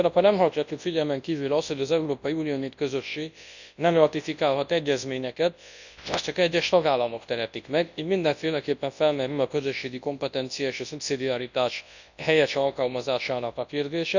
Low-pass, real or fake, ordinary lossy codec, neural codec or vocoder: 7.2 kHz; fake; none; codec, 24 kHz, 0.9 kbps, WavTokenizer, large speech release